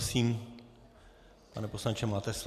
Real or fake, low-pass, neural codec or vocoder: real; 14.4 kHz; none